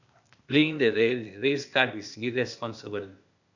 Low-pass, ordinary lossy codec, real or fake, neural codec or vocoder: 7.2 kHz; none; fake; codec, 16 kHz, 0.8 kbps, ZipCodec